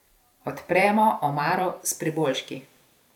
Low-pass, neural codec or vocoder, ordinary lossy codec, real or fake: 19.8 kHz; vocoder, 44.1 kHz, 128 mel bands every 512 samples, BigVGAN v2; none; fake